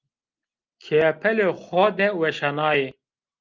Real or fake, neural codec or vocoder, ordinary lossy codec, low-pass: real; none; Opus, 32 kbps; 7.2 kHz